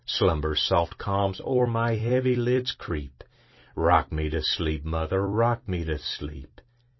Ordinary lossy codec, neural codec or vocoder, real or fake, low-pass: MP3, 24 kbps; vocoder, 44.1 kHz, 128 mel bands every 256 samples, BigVGAN v2; fake; 7.2 kHz